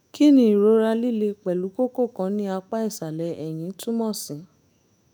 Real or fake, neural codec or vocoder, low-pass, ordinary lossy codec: fake; autoencoder, 48 kHz, 128 numbers a frame, DAC-VAE, trained on Japanese speech; none; none